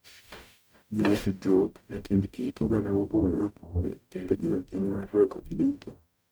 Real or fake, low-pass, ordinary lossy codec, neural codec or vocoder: fake; none; none; codec, 44.1 kHz, 0.9 kbps, DAC